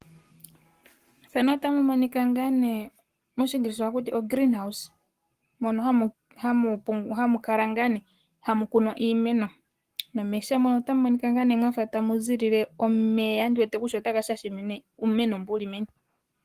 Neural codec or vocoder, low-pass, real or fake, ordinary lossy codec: codec, 44.1 kHz, 7.8 kbps, Pupu-Codec; 14.4 kHz; fake; Opus, 24 kbps